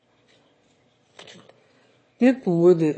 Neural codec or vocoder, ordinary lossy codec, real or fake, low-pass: autoencoder, 22.05 kHz, a latent of 192 numbers a frame, VITS, trained on one speaker; MP3, 32 kbps; fake; 9.9 kHz